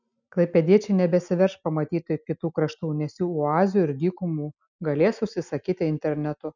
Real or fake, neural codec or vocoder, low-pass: real; none; 7.2 kHz